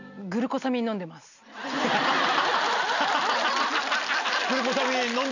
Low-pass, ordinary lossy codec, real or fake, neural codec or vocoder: 7.2 kHz; none; real; none